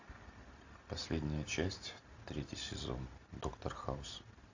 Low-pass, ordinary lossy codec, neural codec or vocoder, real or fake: 7.2 kHz; AAC, 48 kbps; none; real